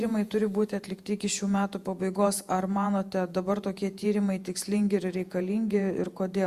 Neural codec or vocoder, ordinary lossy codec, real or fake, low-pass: vocoder, 48 kHz, 128 mel bands, Vocos; Opus, 64 kbps; fake; 14.4 kHz